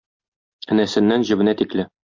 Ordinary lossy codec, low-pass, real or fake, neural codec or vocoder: MP3, 48 kbps; 7.2 kHz; real; none